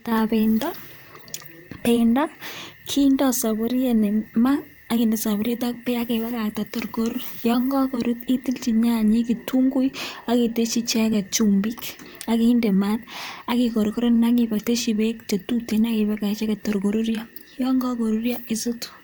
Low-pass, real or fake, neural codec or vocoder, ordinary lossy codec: none; fake; vocoder, 44.1 kHz, 128 mel bands, Pupu-Vocoder; none